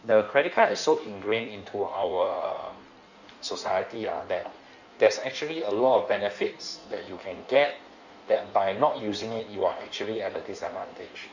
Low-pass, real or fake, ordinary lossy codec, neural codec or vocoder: 7.2 kHz; fake; none; codec, 16 kHz in and 24 kHz out, 1.1 kbps, FireRedTTS-2 codec